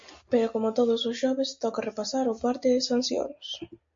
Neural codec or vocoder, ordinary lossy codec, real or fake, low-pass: none; MP3, 48 kbps; real; 7.2 kHz